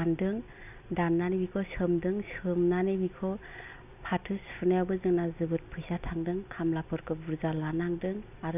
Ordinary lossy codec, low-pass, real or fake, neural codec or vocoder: none; 3.6 kHz; real; none